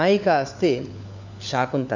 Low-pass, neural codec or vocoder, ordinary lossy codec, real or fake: 7.2 kHz; codec, 16 kHz, 4 kbps, FunCodec, trained on LibriTTS, 50 frames a second; none; fake